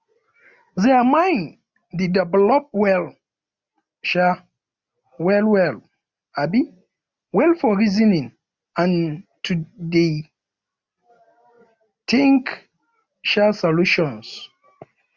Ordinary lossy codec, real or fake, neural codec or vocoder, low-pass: Opus, 64 kbps; real; none; 7.2 kHz